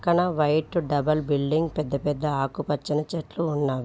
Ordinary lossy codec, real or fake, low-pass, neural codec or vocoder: none; real; none; none